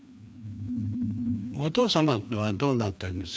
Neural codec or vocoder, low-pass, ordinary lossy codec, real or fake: codec, 16 kHz, 2 kbps, FreqCodec, larger model; none; none; fake